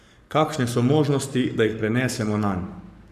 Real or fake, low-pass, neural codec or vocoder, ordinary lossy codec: fake; 14.4 kHz; codec, 44.1 kHz, 7.8 kbps, Pupu-Codec; none